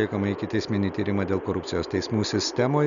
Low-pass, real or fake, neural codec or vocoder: 7.2 kHz; real; none